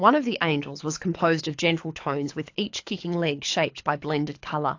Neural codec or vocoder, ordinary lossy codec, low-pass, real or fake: codec, 24 kHz, 6 kbps, HILCodec; AAC, 48 kbps; 7.2 kHz; fake